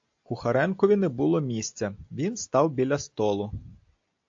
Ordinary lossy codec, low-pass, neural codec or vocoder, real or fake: AAC, 48 kbps; 7.2 kHz; none; real